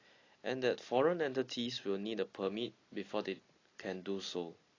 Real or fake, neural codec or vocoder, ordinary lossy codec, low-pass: real; none; AAC, 32 kbps; 7.2 kHz